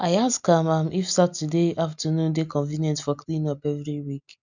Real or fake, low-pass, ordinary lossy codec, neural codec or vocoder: real; 7.2 kHz; none; none